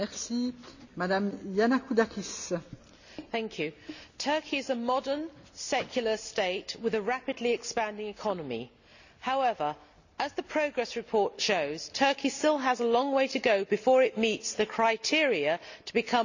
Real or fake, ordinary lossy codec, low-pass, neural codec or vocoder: real; none; 7.2 kHz; none